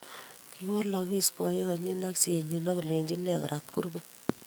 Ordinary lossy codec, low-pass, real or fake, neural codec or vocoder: none; none; fake; codec, 44.1 kHz, 2.6 kbps, SNAC